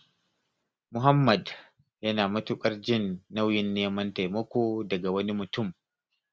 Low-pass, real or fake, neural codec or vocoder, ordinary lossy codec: none; real; none; none